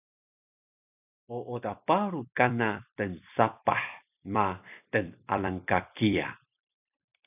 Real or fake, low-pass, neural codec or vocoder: fake; 3.6 kHz; vocoder, 44.1 kHz, 128 mel bands every 256 samples, BigVGAN v2